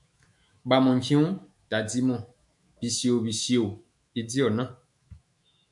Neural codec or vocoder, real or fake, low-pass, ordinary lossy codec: autoencoder, 48 kHz, 128 numbers a frame, DAC-VAE, trained on Japanese speech; fake; 10.8 kHz; MP3, 96 kbps